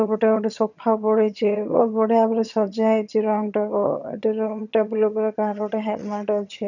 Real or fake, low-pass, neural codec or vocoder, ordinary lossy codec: fake; 7.2 kHz; vocoder, 22.05 kHz, 80 mel bands, HiFi-GAN; none